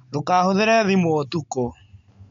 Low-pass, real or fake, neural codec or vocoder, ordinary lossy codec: 7.2 kHz; real; none; MP3, 48 kbps